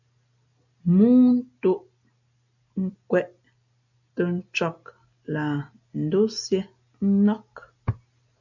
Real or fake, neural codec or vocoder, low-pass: real; none; 7.2 kHz